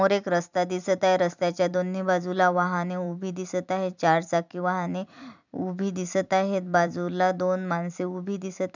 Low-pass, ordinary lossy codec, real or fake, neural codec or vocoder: 7.2 kHz; none; fake; vocoder, 44.1 kHz, 128 mel bands every 256 samples, BigVGAN v2